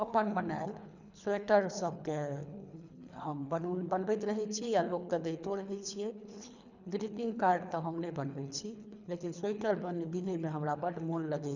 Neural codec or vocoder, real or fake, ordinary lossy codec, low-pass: codec, 24 kHz, 3 kbps, HILCodec; fake; none; 7.2 kHz